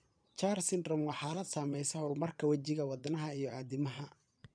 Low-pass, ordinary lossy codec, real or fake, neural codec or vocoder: 9.9 kHz; none; fake; vocoder, 22.05 kHz, 80 mel bands, Vocos